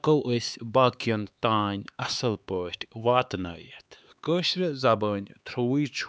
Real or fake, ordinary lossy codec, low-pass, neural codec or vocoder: fake; none; none; codec, 16 kHz, 4 kbps, X-Codec, HuBERT features, trained on LibriSpeech